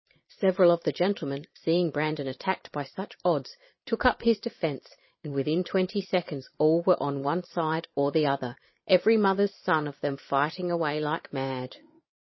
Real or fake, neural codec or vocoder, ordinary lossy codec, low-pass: fake; vocoder, 44.1 kHz, 128 mel bands every 512 samples, BigVGAN v2; MP3, 24 kbps; 7.2 kHz